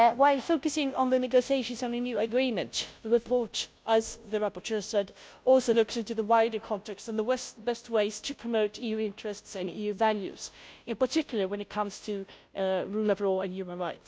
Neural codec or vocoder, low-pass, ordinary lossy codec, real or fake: codec, 16 kHz, 0.5 kbps, FunCodec, trained on Chinese and English, 25 frames a second; none; none; fake